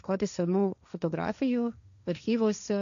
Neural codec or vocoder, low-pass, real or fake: codec, 16 kHz, 1.1 kbps, Voila-Tokenizer; 7.2 kHz; fake